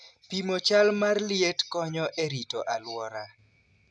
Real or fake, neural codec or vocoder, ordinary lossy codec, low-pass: real; none; none; none